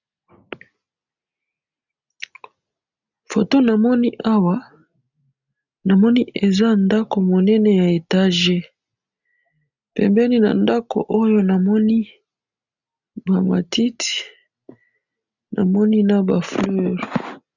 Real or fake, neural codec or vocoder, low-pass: real; none; 7.2 kHz